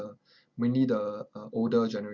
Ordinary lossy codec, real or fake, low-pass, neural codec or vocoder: Opus, 64 kbps; real; 7.2 kHz; none